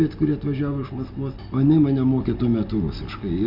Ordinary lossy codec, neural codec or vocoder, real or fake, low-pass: Opus, 64 kbps; none; real; 5.4 kHz